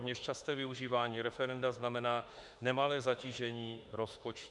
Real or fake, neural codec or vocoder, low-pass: fake; autoencoder, 48 kHz, 32 numbers a frame, DAC-VAE, trained on Japanese speech; 10.8 kHz